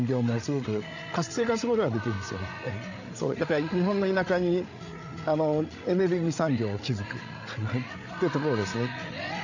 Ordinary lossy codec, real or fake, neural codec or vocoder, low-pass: none; fake; codec, 16 kHz, 8 kbps, FreqCodec, larger model; 7.2 kHz